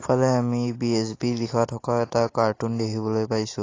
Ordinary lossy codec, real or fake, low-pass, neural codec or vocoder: AAC, 32 kbps; real; 7.2 kHz; none